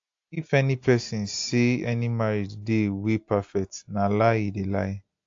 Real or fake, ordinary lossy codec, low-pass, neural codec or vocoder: real; AAC, 48 kbps; 7.2 kHz; none